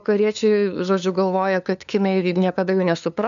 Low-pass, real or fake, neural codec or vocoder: 7.2 kHz; fake; codec, 16 kHz, 2 kbps, FunCodec, trained on LibriTTS, 25 frames a second